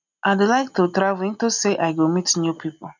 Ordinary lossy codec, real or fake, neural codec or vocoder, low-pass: none; real; none; 7.2 kHz